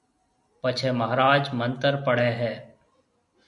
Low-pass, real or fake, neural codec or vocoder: 10.8 kHz; real; none